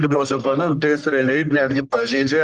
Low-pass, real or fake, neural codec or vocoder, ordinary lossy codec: 10.8 kHz; fake; codec, 44.1 kHz, 1.7 kbps, Pupu-Codec; Opus, 24 kbps